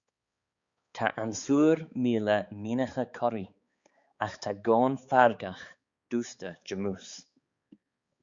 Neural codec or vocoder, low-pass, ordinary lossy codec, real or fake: codec, 16 kHz, 4 kbps, X-Codec, HuBERT features, trained on balanced general audio; 7.2 kHz; Opus, 64 kbps; fake